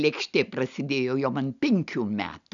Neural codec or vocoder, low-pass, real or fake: none; 7.2 kHz; real